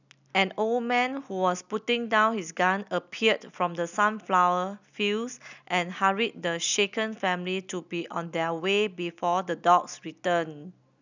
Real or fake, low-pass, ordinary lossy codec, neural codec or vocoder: real; 7.2 kHz; none; none